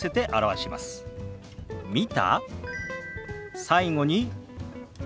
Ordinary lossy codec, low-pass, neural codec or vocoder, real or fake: none; none; none; real